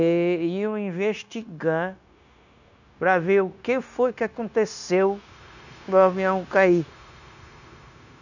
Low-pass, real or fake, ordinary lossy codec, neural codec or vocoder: 7.2 kHz; fake; none; codec, 16 kHz, 0.9 kbps, LongCat-Audio-Codec